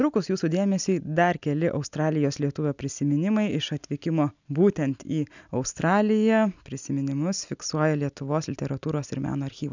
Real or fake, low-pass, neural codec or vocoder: real; 7.2 kHz; none